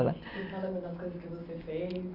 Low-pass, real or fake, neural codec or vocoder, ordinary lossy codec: 5.4 kHz; real; none; none